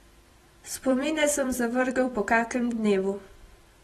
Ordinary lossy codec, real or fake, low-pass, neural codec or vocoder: AAC, 32 kbps; fake; 19.8 kHz; vocoder, 44.1 kHz, 128 mel bands every 256 samples, BigVGAN v2